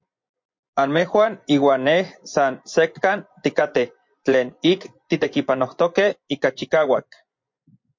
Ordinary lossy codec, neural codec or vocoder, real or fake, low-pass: MP3, 48 kbps; vocoder, 24 kHz, 100 mel bands, Vocos; fake; 7.2 kHz